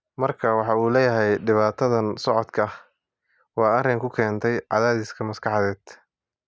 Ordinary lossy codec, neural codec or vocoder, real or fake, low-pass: none; none; real; none